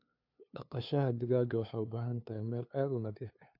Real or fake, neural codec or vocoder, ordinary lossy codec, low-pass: fake; codec, 16 kHz, 2 kbps, FunCodec, trained on LibriTTS, 25 frames a second; AAC, 48 kbps; 5.4 kHz